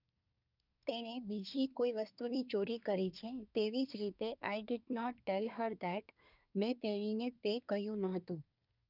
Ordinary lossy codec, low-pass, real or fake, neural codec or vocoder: none; 5.4 kHz; fake; codec, 24 kHz, 1 kbps, SNAC